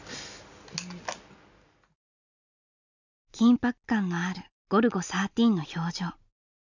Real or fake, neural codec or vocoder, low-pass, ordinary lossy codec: real; none; 7.2 kHz; none